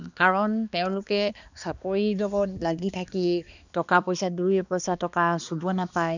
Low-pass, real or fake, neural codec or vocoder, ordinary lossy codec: 7.2 kHz; fake; codec, 16 kHz, 2 kbps, X-Codec, HuBERT features, trained on balanced general audio; none